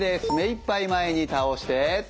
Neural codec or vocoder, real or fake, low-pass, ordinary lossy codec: none; real; none; none